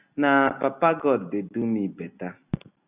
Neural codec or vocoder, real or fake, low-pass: none; real; 3.6 kHz